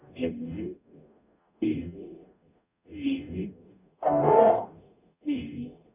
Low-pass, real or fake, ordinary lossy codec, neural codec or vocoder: 3.6 kHz; fake; none; codec, 44.1 kHz, 0.9 kbps, DAC